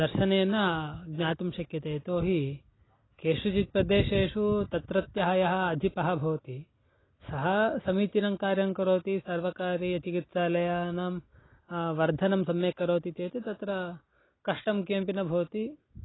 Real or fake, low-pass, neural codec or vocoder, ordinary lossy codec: real; 7.2 kHz; none; AAC, 16 kbps